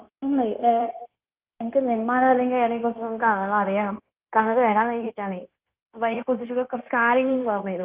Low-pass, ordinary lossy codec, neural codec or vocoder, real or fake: 3.6 kHz; Opus, 32 kbps; codec, 16 kHz, 0.9 kbps, LongCat-Audio-Codec; fake